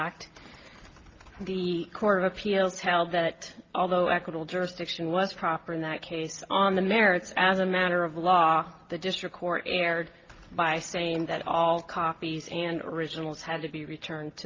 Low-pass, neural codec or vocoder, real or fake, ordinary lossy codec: 7.2 kHz; none; real; Opus, 24 kbps